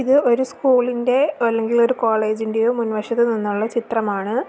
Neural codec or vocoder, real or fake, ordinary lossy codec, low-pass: none; real; none; none